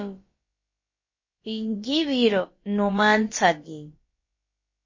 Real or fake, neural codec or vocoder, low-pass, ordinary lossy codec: fake; codec, 16 kHz, about 1 kbps, DyCAST, with the encoder's durations; 7.2 kHz; MP3, 32 kbps